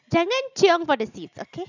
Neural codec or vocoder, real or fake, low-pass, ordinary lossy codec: none; real; 7.2 kHz; none